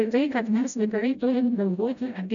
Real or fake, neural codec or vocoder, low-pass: fake; codec, 16 kHz, 0.5 kbps, FreqCodec, smaller model; 7.2 kHz